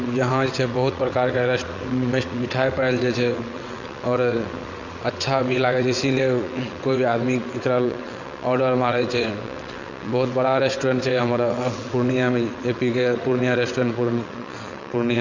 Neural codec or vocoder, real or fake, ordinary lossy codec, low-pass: vocoder, 22.05 kHz, 80 mel bands, WaveNeXt; fake; none; 7.2 kHz